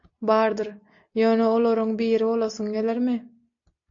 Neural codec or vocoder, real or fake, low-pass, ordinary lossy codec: none; real; 7.2 kHz; AAC, 48 kbps